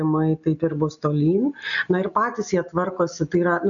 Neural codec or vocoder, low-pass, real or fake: none; 7.2 kHz; real